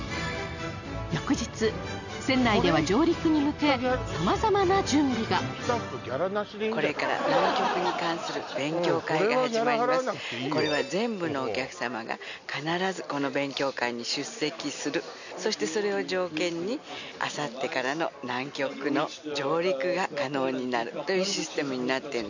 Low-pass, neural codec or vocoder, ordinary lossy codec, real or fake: 7.2 kHz; none; none; real